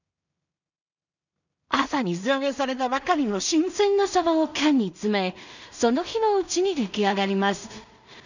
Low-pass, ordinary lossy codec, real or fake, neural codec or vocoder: 7.2 kHz; none; fake; codec, 16 kHz in and 24 kHz out, 0.4 kbps, LongCat-Audio-Codec, two codebook decoder